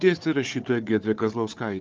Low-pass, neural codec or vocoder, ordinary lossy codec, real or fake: 7.2 kHz; codec, 16 kHz, 4 kbps, FunCodec, trained on Chinese and English, 50 frames a second; Opus, 32 kbps; fake